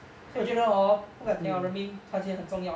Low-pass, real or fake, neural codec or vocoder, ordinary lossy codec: none; real; none; none